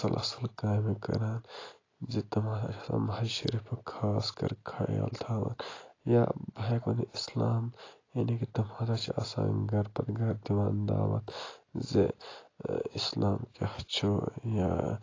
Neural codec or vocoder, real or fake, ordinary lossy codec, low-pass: none; real; AAC, 32 kbps; 7.2 kHz